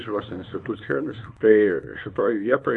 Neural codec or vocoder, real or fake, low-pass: codec, 24 kHz, 0.9 kbps, WavTokenizer, small release; fake; 10.8 kHz